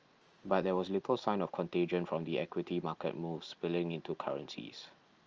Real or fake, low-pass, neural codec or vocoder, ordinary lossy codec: real; 7.2 kHz; none; Opus, 24 kbps